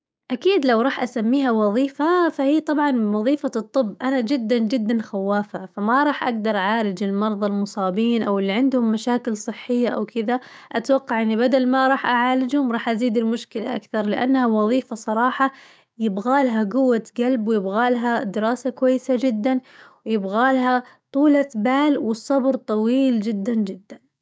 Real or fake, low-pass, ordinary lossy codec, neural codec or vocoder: fake; none; none; codec, 16 kHz, 6 kbps, DAC